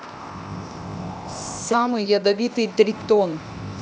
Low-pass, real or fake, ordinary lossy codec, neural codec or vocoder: none; fake; none; codec, 16 kHz, 0.8 kbps, ZipCodec